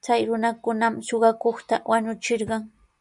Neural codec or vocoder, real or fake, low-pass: none; real; 10.8 kHz